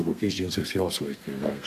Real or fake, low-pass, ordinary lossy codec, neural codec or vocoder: fake; 14.4 kHz; AAC, 64 kbps; codec, 32 kHz, 1.9 kbps, SNAC